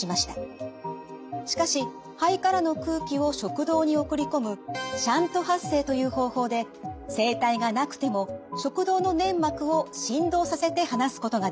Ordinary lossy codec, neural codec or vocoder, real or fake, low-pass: none; none; real; none